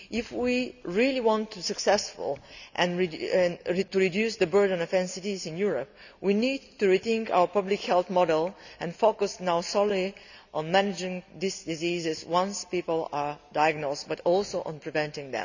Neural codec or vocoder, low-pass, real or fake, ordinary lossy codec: none; 7.2 kHz; real; none